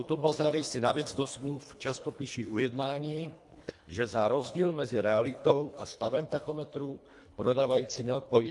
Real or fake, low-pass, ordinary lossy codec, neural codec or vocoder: fake; 10.8 kHz; AAC, 64 kbps; codec, 24 kHz, 1.5 kbps, HILCodec